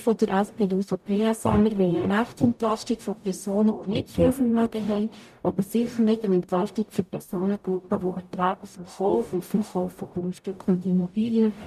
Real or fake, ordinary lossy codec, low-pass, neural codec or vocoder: fake; none; 14.4 kHz; codec, 44.1 kHz, 0.9 kbps, DAC